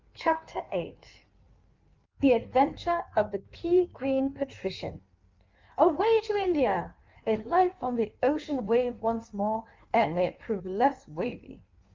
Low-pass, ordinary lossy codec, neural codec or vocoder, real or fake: 7.2 kHz; Opus, 24 kbps; codec, 16 kHz in and 24 kHz out, 1.1 kbps, FireRedTTS-2 codec; fake